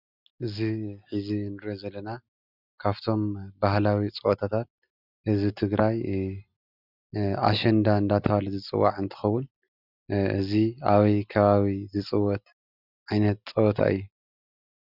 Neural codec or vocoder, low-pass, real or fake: none; 5.4 kHz; real